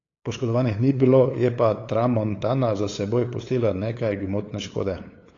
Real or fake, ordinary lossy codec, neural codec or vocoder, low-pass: fake; AAC, 32 kbps; codec, 16 kHz, 8 kbps, FunCodec, trained on LibriTTS, 25 frames a second; 7.2 kHz